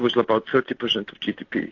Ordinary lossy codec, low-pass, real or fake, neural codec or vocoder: AAC, 48 kbps; 7.2 kHz; real; none